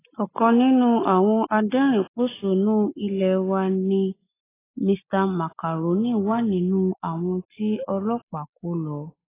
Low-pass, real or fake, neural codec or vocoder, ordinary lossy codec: 3.6 kHz; real; none; AAC, 16 kbps